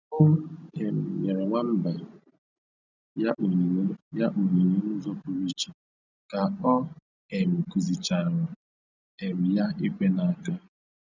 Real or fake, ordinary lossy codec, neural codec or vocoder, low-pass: real; none; none; 7.2 kHz